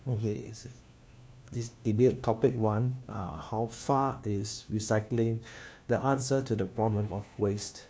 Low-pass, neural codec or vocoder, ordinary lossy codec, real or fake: none; codec, 16 kHz, 1 kbps, FunCodec, trained on LibriTTS, 50 frames a second; none; fake